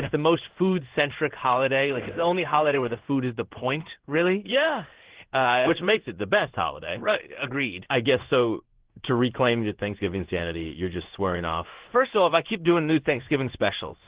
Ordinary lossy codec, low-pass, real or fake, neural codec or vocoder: Opus, 16 kbps; 3.6 kHz; fake; codec, 16 kHz in and 24 kHz out, 1 kbps, XY-Tokenizer